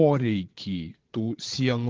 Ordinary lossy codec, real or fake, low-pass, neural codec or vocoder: Opus, 16 kbps; fake; 7.2 kHz; vocoder, 22.05 kHz, 80 mel bands, Vocos